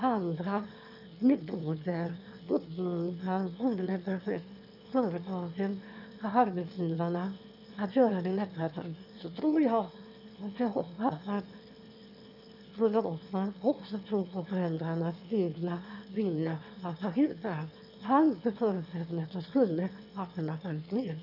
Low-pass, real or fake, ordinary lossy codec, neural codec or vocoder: 5.4 kHz; fake; none; autoencoder, 22.05 kHz, a latent of 192 numbers a frame, VITS, trained on one speaker